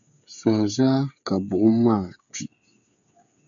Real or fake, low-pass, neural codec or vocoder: fake; 7.2 kHz; codec, 16 kHz, 8 kbps, FreqCodec, smaller model